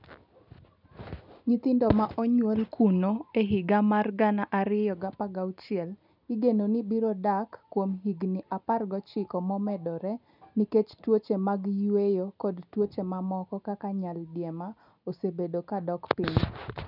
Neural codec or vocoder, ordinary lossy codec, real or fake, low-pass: none; none; real; 5.4 kHz